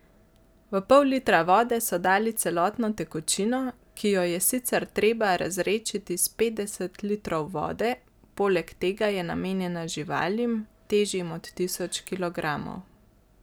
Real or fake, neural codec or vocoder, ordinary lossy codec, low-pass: real; none; none; none